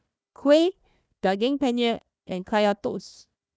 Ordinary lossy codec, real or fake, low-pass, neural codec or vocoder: none; fake; none; codec, 16 kHz, 1 kbps, FunCodec, trained on Chinese and English, 50 frames a second